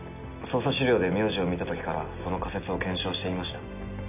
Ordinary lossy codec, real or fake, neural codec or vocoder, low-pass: none; real; none; 3.6 kHz